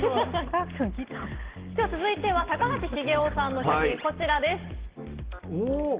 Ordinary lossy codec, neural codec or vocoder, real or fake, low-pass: Opus, 16 kbps; none; real; 3.6 kHz